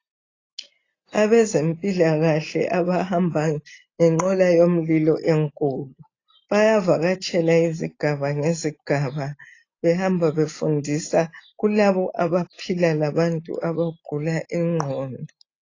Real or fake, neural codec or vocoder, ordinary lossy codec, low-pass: real; none; AAC, 32 kbps; 7.2 kHz